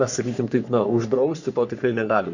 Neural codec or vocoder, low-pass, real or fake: codec, 44.1 kHz, 3.4 kbps, Pupu-Codec; 7.2 kHz; fake